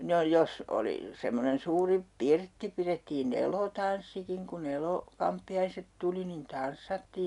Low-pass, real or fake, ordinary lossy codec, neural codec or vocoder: 10.8 kHz; real; none; none